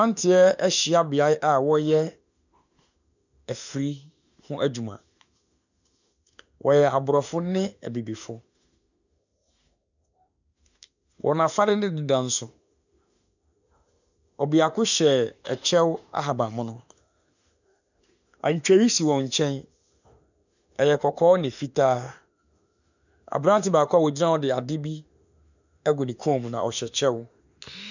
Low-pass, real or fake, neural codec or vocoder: 7.2 kHz; fake; autoencoder, 48 kHz, 32 numbers a frame, DAC-VAE, trained on Japanese speech